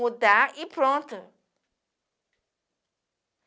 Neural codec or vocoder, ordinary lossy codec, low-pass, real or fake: none; none; none; real